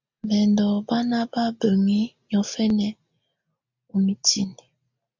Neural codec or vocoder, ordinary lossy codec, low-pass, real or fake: none; MP3, 64 kbps; 7.2 kHz; real